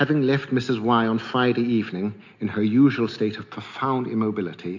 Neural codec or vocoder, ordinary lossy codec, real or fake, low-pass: none; MP3, 48 kbps; real; 7.2 kHz